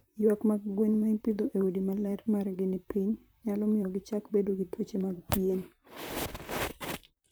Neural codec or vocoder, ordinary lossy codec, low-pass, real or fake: vocoder, 44.1 kHz, 128 mel bands, Pupu-Vocoder; none; none; fake